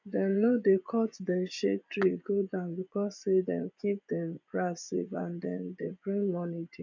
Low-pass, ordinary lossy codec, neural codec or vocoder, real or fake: 7.2 kHz; none; vocoder, 24 kHz, 100 mel bands, Vocos; fake